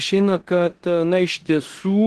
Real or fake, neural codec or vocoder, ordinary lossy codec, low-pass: fake; codec, 16 kHz in and 24 kHz out, 0.9 kbps, LongCat-Audio-Codec, four codebook decoder; Opus, 16 kbps; 10.8 kHz